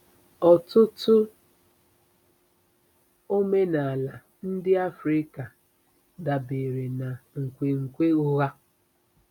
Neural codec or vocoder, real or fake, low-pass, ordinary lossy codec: none; real; 19.8 kHz; none